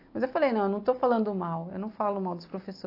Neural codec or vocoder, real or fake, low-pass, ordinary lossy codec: none; real; 5.4 kHz; AAC, 32 kbps